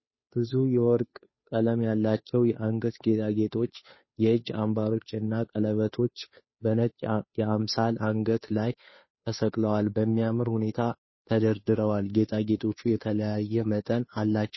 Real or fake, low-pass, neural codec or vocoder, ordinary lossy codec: fake; 7.2 kHz; codec, 16 kHz, 2 kbps, FunCodec, trained on Chinese and English, 25 frames a second; MP3, 24 kbps